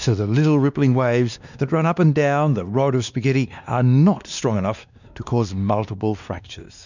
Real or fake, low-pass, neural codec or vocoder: fake; 7.2 kHz; codec, 16 kHz, 2 kbps, X-Codec, WavLM features, trained on Multilingual LibriSpeech